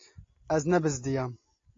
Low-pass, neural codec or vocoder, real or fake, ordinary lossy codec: 7.2 kHz; none; real; AAC, 32 kbps